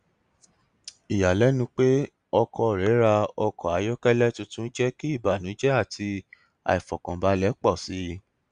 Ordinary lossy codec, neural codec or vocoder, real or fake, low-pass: Opus, 64 kbps; none; real; 9.9 kHz